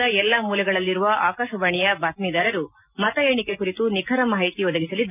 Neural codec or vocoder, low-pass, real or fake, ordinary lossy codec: none; 3.6 kHz; real; none